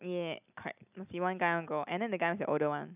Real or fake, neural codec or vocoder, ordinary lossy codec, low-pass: real; none; none; 3.6 kHz